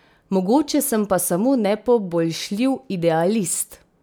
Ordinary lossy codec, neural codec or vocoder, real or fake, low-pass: none; none; real; none